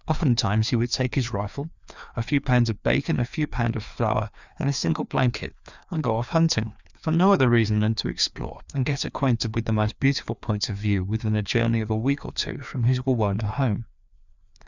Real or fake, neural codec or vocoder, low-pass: fake; codec, 16 kHz, 2 kbps, FreqCodec, larger model; 7.2 kHz